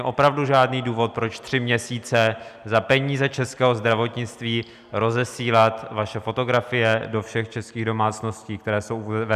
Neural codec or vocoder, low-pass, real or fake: none; 14.4 kHz; real